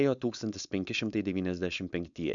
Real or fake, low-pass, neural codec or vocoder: fake; 7.2 kHz; codec, 16 kHz, 4.8 kbps, FACodec